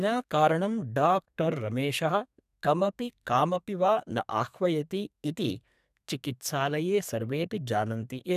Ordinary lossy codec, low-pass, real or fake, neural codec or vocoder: none; 14.4 kHz; fake; codec, 32 kHz, 1.9 kbps, SNAC